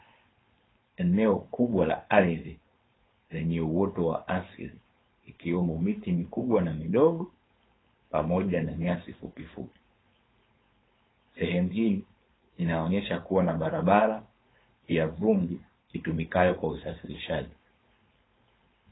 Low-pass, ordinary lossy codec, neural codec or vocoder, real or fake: 7.2 kHz; AAC, 16 kbps; codec, 16 kHz, 4.8 kbps, FACodec; fake